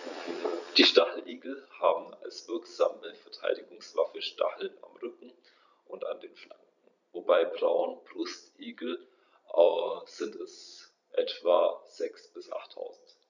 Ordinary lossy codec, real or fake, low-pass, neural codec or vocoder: none; fake; 7.2 kHz; vocoder, 22.05 kHz, 80 mel bands, Vocos